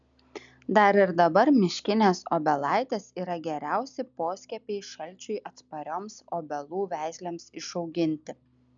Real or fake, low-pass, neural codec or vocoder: real; 7.2 kHz; none